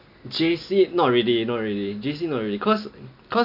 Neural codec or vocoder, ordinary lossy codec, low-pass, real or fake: none; none; 5.4 kHz; real